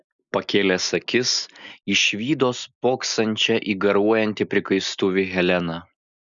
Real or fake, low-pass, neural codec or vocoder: real; 7.2 kHz; none